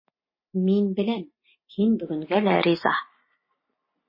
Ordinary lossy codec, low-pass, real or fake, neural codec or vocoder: MP3, 24 kbps; 5.4 kHz; real; none